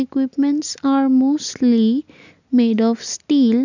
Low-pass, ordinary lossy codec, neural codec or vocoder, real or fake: 7.2 kHz; none; none; real